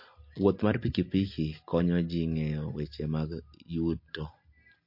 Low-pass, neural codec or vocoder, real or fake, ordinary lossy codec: 5.4 kHz; none; real; MP3, 32 kbps